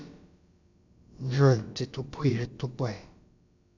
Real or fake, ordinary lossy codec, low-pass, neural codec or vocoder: fake; none; 7.2 kHz; codec, 16 kHz, about 1 kbps, DyCAST, with the encoder's durations